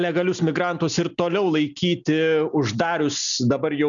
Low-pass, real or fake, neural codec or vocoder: 7.2 kHz; real; none